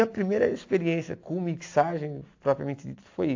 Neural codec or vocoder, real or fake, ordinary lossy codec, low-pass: none; real; AAC, 48 kbps; 7.2 kHz